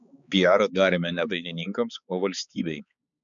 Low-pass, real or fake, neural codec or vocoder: 7.2 kHz; fake; codec, 16 kHz, 4 kbps, X-Codec, HuBERT features, trained on balanced general audio